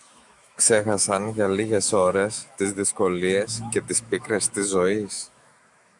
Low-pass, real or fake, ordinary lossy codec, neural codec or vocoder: 10.8 kHz; fake; MP3, 96 kbps; autoencoder, 48 kHz, 128 numbers a frame, DAC-VAE, trained on Japanese speech